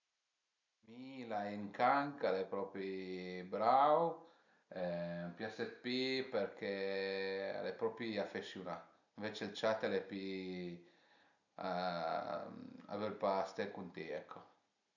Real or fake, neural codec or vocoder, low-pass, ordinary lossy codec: real; none; 7.2 kHz; none